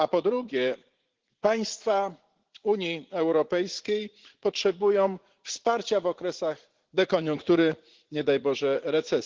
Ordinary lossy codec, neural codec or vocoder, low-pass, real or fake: Opus, 16 kbps; vocoder, 44.1 kHz, 80 mel bands, Vocos; 7.2 kHz; fake